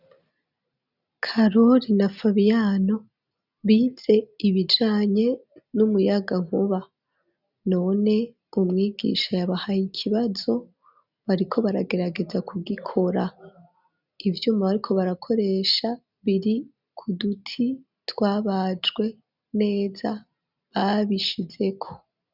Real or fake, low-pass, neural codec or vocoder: real; 5.4 kHz; none